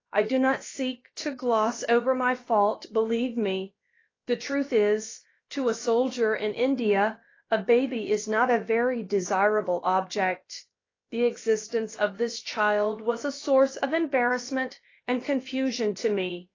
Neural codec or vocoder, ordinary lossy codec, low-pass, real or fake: codec, 16 kHz, about 1 kbps, DyCAST, with the encoder's durations; AAC, 32 kbps; 7.2 kHz; fake